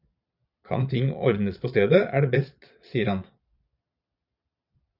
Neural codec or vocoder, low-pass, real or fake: vocoder, 22.05 kHz, 80 mel bands, Vocos; 5.4 kHz; fake